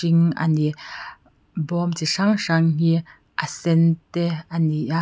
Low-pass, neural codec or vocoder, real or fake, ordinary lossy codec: none; none; real; none